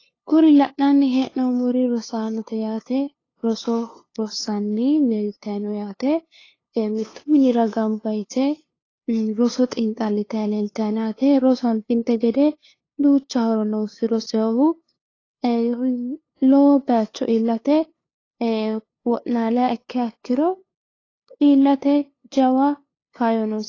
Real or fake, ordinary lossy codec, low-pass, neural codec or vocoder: fake; AAC, 32 kbps; 7.2 kHz; codec, 16 kHz, 2 kbps, FunCodec, trained on LibriTTS, 25 frames a second